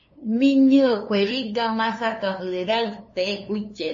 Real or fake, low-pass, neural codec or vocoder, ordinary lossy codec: fake; 7.2 kHz; codec, 16 kHz, 2 kbps, FunCodec, trained on LibriTTS, 25 frames a second; MP3, 32 kbps